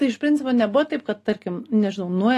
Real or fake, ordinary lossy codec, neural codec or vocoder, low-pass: real; AAC, 48 kbps; none; 14.4 kHz